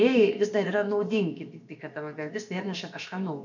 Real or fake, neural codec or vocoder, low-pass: fake; codec, 16 kHz, about 1 kbps, DyCAST, with the encoder's durations; 7.2 kHz